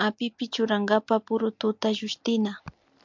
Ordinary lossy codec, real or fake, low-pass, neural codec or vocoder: MP3, 64 kbps; real; 7.2 kHz; none